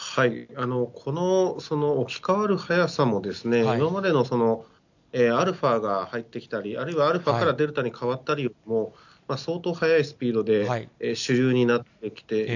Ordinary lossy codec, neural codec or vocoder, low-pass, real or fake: none; none; 7.2 kHz; real